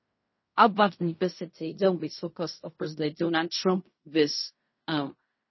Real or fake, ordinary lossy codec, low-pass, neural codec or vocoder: fake; MP3, 24 kbps; 7.2 kHz; codec, 16 kHz in and 24 kHz out, 0.4 kbps, LongCat-Audio-Codec, fine tuned four codebook decoder